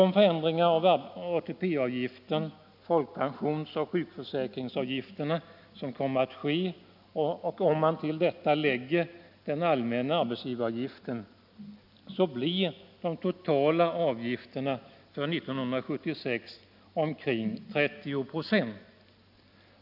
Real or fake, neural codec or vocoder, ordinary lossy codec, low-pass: real; none; none; 5.4 kHz